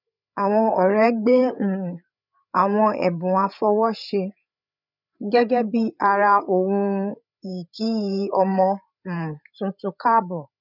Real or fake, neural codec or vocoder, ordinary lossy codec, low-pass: fake; codec, 16 kHz, 8 kbps, FreqCodec, larger model; none; 5.4 kHz